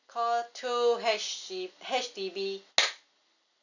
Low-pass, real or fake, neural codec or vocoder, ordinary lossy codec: 7.2 kHz; real; none; none